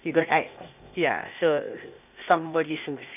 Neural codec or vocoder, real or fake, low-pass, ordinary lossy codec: codec, 16 kHz, 0.8 kbps, ZipCodec; fake; 3.6 kHz; none